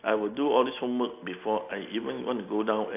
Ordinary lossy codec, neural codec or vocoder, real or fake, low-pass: AAC, 32 kbps; none; real; 3.6 kHz